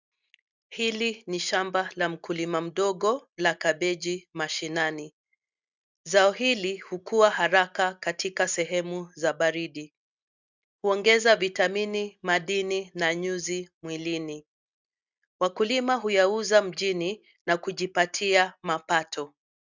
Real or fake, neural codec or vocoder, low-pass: real; none; 7.2 kHz